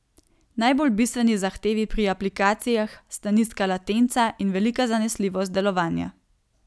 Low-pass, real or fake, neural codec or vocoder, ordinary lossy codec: none; real; none; none